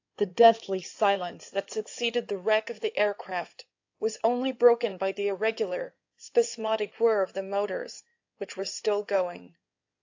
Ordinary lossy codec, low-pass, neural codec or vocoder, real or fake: AAC, 48 kbps; 7.2 kHz; codec, 16 kHz in and 24 kHz out, 2.2 kbps, FireRedTTS-2 codec; fake